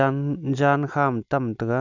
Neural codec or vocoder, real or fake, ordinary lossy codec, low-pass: none; real; none; 7.2 kHz